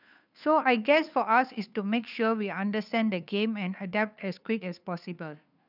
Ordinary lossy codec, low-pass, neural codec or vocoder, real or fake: none; 5.4 kHz; codec, 16 kHz, 2 kbps, FunCodec, trained on Chinese and English, 25 frames a second; fake